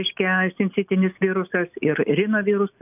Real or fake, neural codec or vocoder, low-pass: real; none; 3.6 kHz